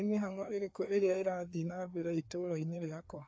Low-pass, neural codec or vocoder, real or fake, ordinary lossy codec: none; codec, 16 kHz, 2 kbps, FreqCodec, larger model; fake; none